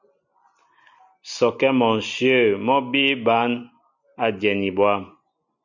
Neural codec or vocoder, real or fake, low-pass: none; real; 7.2 kHz